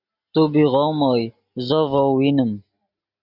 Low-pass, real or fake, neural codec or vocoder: 5.4 kHz; real; none